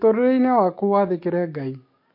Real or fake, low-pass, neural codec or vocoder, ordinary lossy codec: fake; 5.4 kHz; codec, 44.1 kHz, 7.8 kbps, DAC; MP3, 32 kbps